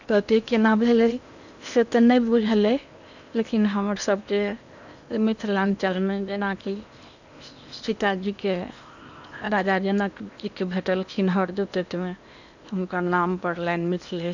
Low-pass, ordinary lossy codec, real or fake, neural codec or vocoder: 7.2 kHz; none; fake; codec, 16 kHz in and 24 kHz out, 0.8 kbps, FocalCodec, streaming, 65536 codes